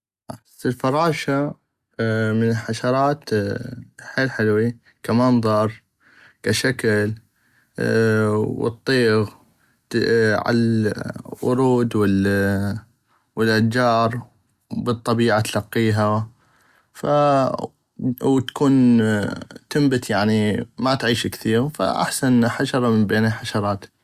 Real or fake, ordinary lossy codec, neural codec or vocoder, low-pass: real; Opus, 64 kbps; none; 14.4 kHz